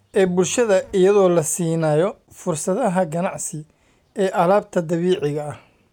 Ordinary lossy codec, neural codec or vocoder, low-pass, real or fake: none; none; 19.8 kHz; real